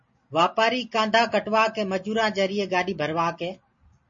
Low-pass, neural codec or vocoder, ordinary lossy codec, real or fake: 7.2 kHz; none; MP3, 32 kbps; real